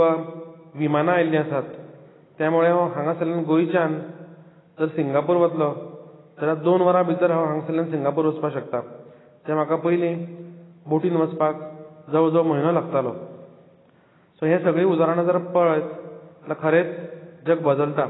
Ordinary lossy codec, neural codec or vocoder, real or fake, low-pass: AAC, 16 kbps; none; real; 7.2 kHz